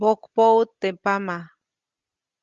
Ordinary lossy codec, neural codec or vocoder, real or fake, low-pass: Opus, 32 kbps; none; real; 7.2 kHz